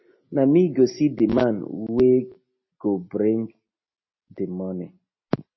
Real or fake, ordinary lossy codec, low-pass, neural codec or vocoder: real; MP3, 24 kbps; 7.2 kHz; none